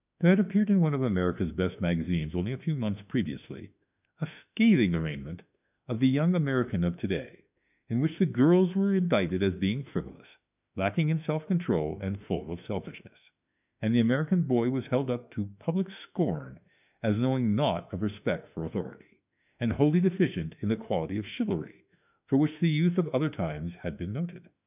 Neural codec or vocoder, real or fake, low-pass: autoencoder, 48 kHz, 32 numbers a frame, DAC-VAE, trained on Japanese speech; fake; 3.6 kHz